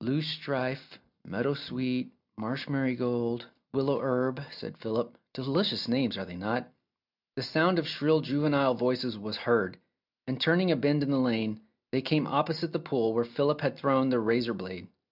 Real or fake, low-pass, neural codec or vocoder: real; 5.4 kHz; none